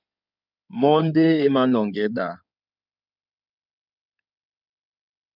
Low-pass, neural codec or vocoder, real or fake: 5.4 kHz; codec, 16 kHz in and 24 kHz out, 2.2 kbps, FireRedTTS-2 codec; fake